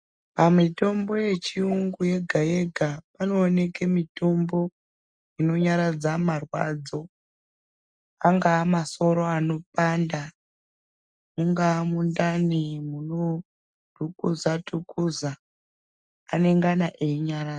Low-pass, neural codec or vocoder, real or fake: 9.9 kHz; none; real